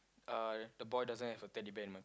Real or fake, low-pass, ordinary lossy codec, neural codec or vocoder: real; none; none; none